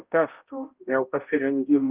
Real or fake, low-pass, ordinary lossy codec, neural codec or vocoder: fake; 3.6 kHz; Opus, 24 kbps; codec, 16 kHz, 0.5 kbps, X-Codec, HuBERT features, trained on balanced general audio